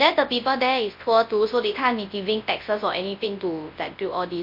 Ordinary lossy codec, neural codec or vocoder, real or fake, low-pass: MP3, 32 kbps; codec, 24 kHz, 0.9 kbps, WavTokenizer, large speech release; fake; 5.4 kHz